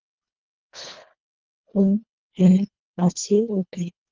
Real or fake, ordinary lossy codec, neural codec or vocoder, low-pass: fake; Opus, 32 kbps; codec, 24 kHz, 1.5 kbps, HILCodec; 7.2 kHz